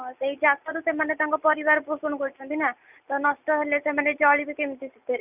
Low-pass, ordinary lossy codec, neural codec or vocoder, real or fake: 3.6 kHz; none; none; real